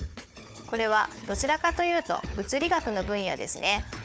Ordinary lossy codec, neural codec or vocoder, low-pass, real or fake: none; codec, 16 kHz, 4 kbps, FunCodec, trained on Chinese and English, 50 frames a second; none; fake